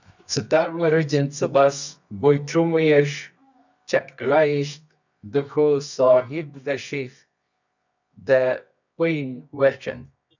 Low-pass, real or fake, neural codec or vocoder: 7.2 kHz; fake; codec, 24 kHz, 0.9 kbps, WavTokenizer, medium music audio release